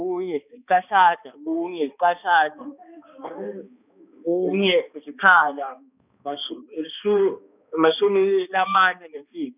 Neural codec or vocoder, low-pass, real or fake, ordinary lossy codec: codec, 16 kHz, 2 kbps, X-Codec, HuBERT features, trained on balanced general audio; 3.6 kHz; fake; none